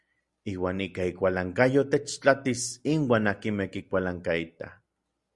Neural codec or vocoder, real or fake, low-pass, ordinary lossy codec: none; real; 10.8 kHz; Opus, 64 kbps